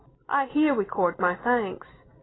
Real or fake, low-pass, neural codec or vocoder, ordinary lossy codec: real; 7.2 kHz; none; AAC, 16 kbps